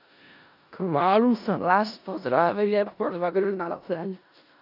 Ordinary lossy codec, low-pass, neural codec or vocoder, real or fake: none; 5.4 kHz; codec, 16 kHz in and 24 kHz out, 0.4 kbps, LongCat-Audio-Codec, four codebook decoder; fake